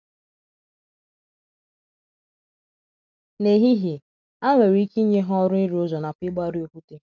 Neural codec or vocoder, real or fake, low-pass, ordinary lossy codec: none; real; 7.2 kHz; none